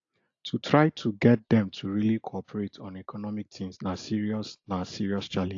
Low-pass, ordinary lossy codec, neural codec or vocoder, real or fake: 7.2 kHz; AAC, 48 kbps; none; real